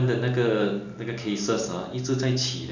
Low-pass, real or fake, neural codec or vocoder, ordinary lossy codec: 7.2 kHz; real; none; none